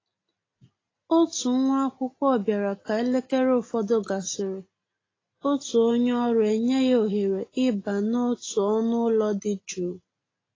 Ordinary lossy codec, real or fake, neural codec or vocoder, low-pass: AAC, 32 kbps; real; none; 7.2 kHz